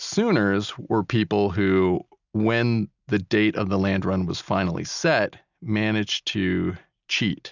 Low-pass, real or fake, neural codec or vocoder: 7.2 kHz; real; none